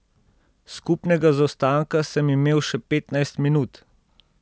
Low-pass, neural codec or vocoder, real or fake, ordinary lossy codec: none; none; real; none